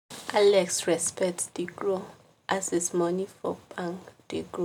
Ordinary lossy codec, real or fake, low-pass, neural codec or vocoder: none; real; 19.8 kHz; none